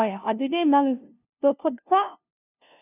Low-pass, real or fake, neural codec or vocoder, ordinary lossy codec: 3.6 kHz; fake; codec, 16 kHz, 0.5 kbps, FunCodec, trained on LibriTTS, 25 frames a second; none